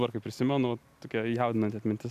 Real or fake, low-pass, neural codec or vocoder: real; 14.4 kHz; none